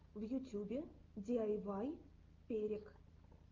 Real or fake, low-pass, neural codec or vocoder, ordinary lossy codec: real; 7.2 kHz; none; Opus, 24 kbps